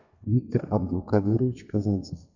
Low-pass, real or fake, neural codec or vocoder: 7.2 kHz; fake; codec, 32 kHz, 1.9 kbps, SNAC